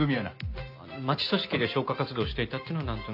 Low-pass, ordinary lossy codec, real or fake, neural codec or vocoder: 5.4 kHz; AAC, 48 kbps; real; none